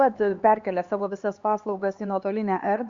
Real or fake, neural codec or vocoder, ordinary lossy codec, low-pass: fake; codec, 16 kHz, 2 kbps, X-Codec, HuBERT features, trained on LibriSpeech; Opus, 64 kbps; 7.2 kHz